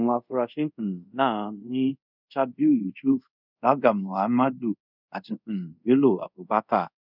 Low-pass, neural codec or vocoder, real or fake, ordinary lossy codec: 5.4 kHz; codec, 24 kHz, 0.5 kbps, DualCodec; fake; none